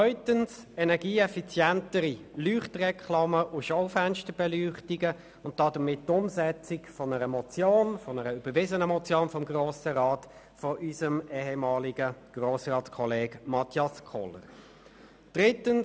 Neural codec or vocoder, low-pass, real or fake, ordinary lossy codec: none; none; real; none